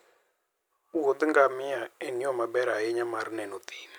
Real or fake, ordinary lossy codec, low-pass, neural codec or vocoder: real; none; none; none